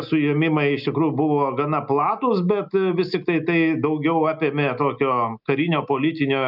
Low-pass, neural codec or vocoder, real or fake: 5.4 kHz; none; real